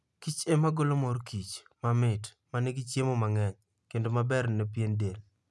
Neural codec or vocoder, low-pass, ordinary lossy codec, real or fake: none; none; none; real